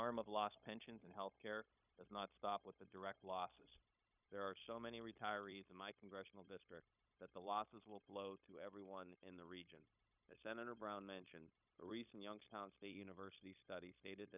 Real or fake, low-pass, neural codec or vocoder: fake; 3.6 kHz; codec, 16 kHz, 0.9 kbps, LongCat-Audio-Codec